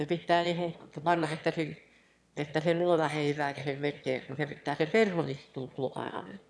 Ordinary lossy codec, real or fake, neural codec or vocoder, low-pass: none; fake; autoencoder, 22.05 kHz, a latent of 192 numbers a frame, VITS, trained on one speaker; none